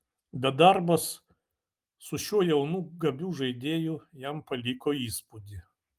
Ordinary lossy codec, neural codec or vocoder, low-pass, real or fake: Opus, 32 kbps; none; 14.4 kHz; real